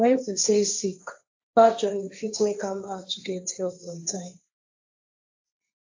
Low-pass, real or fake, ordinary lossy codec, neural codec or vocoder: 7.2 kHz; fake; AAC, 48 kbps; codec, 16 kHz, 1.1 kbps, Voila-Tokenizer